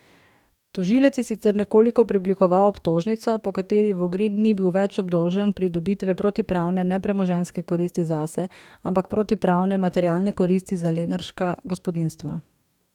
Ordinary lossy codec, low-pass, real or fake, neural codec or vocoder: none; 19.8 kHz; fake; codec, 44.1 kHz, 2.6 kbps, DAC